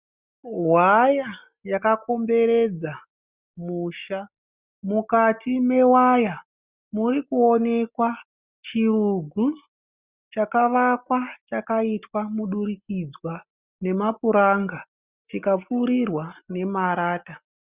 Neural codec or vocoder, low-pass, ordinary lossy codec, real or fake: none; 3.6 kHz; Opus, 64 kbps; real